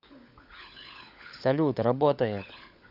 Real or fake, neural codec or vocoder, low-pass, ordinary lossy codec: fake; codec, 16 kHz, 16 kbps, FunCodec, trained on LibriTTS, 50 frames a second; 5.4 kHz; none